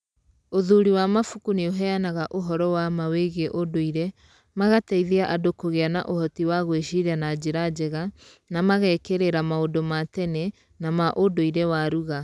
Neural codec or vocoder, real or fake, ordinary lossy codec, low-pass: none; real; none; none